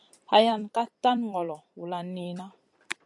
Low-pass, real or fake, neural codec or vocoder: 10.8 kHz; fake; vocoder, 44.1 kHz, 128 mel bands every 256 samples, BigVGAN v2